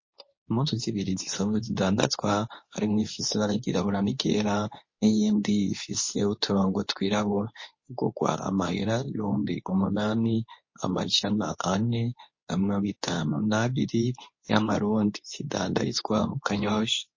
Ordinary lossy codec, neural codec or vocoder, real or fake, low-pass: MP3, 32 kbps; codec, 24 kHz, 0.9 kbps, WavTokenizer, medium speech release version 2; fake; 7.2 kHz